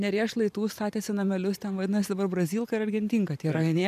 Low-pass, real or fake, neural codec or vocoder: 14.4 kHz; fake; vocoder, 44.1 kHz, 128 mel bands every 512 samples, BigVGAN v2